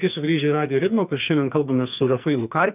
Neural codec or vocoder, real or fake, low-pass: codec, 44.1 kHz, 2.6 kbps, SNAC; fake; 3.6 kHz